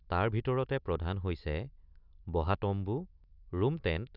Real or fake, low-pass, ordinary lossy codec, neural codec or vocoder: real; 5.4 kHz; none; none